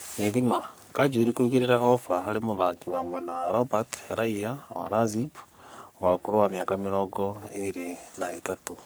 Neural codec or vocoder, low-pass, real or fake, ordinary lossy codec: codec, 44.1 kHz, 3.4 kbps, Pupu-Codec; none; fake; none